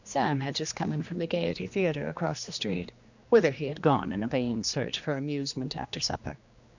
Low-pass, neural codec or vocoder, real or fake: 7.2 kHz; codec, 16 kHz, 2 kbps, X-Codec, HuBERT features, trained on general audio; fake